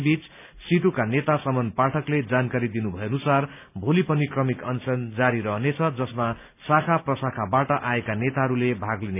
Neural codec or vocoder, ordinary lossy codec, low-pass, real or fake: none; none; 3.6 kHz; real